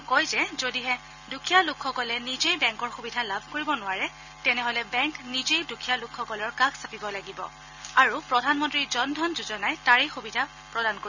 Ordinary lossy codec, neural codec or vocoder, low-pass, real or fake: none; none; 7.2 kHz; real